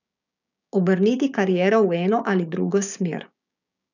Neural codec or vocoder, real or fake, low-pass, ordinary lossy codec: codec, 16 kHz, 6 kbps, DAC; fake; 7.2 kHz; none